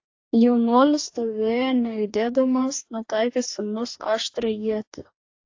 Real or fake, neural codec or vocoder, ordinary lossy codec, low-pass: fake; codec, 44.1 kHz, 2.6 kbps, DAC; AAC, 48 kbps; 7.2 kHz